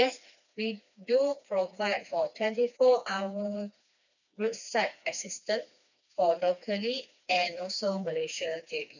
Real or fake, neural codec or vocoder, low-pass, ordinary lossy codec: fake; codec, 16 kHz, 2 kbps, FreqCodec, smaller model; 7.2 kHz; none